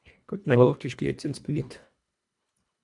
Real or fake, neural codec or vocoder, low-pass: fake; codec, 24 kHz, 1.5 kbps, HILCodec; 10.8 kHz